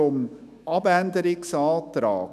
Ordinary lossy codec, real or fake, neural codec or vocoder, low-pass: none; fake; autoencoder, 48 kHz, 128 numbers a frame, DAC-VAE, trained on Japanese speech; 14.4 kHz